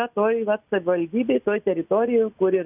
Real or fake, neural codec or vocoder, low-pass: real; none; 3.6 kHz